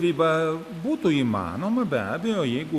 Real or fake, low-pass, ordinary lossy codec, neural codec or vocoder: real; 14.4 kHz; Opus, 64 kbps; none